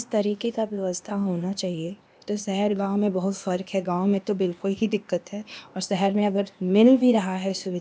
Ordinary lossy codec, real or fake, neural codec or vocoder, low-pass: none; fake; codec, 16 kHz, 0.8 kbps, ZipCodec; none